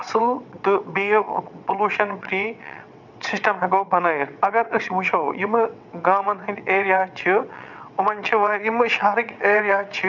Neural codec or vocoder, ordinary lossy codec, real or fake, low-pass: vocoder, 22.05 kHz, 80 mel bands, WaveNeXt; none; fake; 7.2 kHz